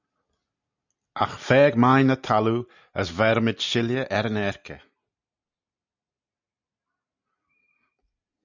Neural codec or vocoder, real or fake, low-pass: none; real; 7.2 kHz